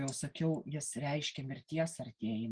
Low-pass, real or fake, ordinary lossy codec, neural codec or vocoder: 9.9 kHz; real; Opus, 24 kbps; none